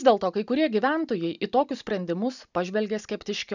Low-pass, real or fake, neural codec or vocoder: 7.2 kHz; real; none